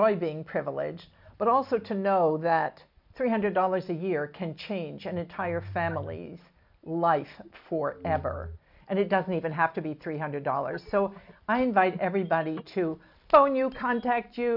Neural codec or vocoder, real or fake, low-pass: none; real; 5.4 kHz